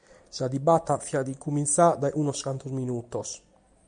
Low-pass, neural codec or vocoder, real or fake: 9.9 kHz; none; real